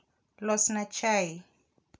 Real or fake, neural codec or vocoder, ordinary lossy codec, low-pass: real; none; none; none